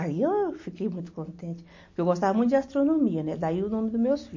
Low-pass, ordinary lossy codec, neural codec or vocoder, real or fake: 7.2 kHz; MP3, 32 kbps; none; real